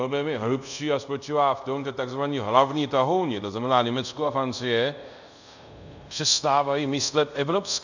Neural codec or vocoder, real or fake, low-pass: codec, 24 kHz, 0.5 kbps, DualCodec; fake; 7.2 kHz